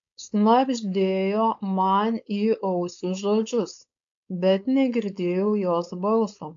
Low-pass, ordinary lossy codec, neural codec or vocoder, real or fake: 7.2 kHz; AAC, 64 kbps; codec, 16 kHz, 4.8 kbps, FACodec; fake